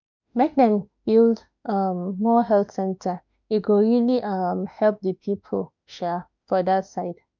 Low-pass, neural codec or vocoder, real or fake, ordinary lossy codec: 7.2 kHz; autoencoder, 48 kHz, 32 numbers a frame, DAC-VAE, trained on Japanese speech; fake; none